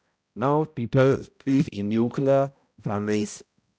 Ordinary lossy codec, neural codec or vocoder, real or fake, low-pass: none; codec, 16 kHz, 0.5 kbps, X-Codec, HuBERT features, trained on balanced general audio; fake; none